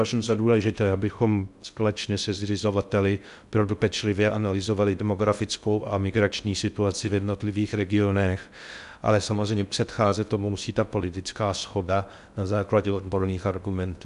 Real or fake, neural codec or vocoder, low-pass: fake; codec, 16 kHz in and 24 kHz out, 0.6 kbps, FocalCodec, streaming, 2048 codes; 10.8 kHz